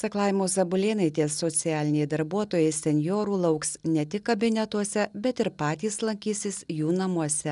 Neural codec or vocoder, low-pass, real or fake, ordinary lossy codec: none; 10.8 kHz; real; MP3, 96 kbps